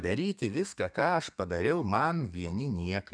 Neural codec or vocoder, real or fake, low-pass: codec, 32 kHz, 1.9 kbps, SNAC; fake; 9.9 kHz